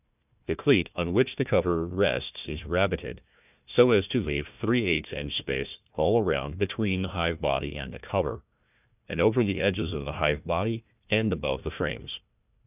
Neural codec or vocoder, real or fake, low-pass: codec, 16 kHz, 1 kbps, FunCodec, trained on Chinese and English, 50 frames a second; fake; 3.6 kHz